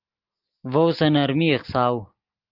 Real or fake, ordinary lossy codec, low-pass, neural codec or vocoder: real; Opus, 24 kbps; 5.4 kHz; none